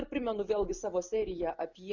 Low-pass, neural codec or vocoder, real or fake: 7.2 kHz; none; real